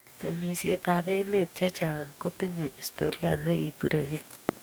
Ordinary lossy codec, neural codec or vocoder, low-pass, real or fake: none; codec, 44.1 kHz, 2.6 kbps, DAC; none; fake